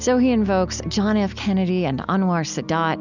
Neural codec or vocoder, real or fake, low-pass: none; real; 7.2 kHz